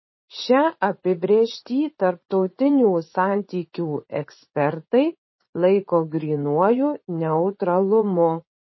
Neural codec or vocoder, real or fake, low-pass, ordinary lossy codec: vocoder, 44.1 kHz, 80 mel bands, Vocos; fake; 7.2 kHz; MP3, 24 kbps